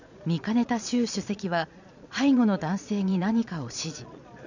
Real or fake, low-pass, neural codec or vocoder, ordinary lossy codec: fake; 7.2 kHz; vocoder, 22.05 kHz, 80 mel bands, Vocos; none